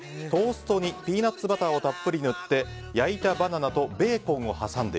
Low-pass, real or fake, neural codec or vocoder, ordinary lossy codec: none; real; none; none